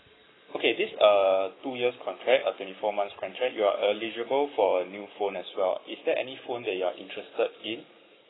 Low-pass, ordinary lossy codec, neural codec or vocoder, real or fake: 7.2 kHz; AAC, 16 kbps; codec, 44.1 kHz, 7.8 kbps, Pupu-Codec; fake